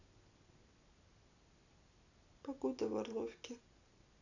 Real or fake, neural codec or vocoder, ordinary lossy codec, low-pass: real; none; none; 7.2 kHz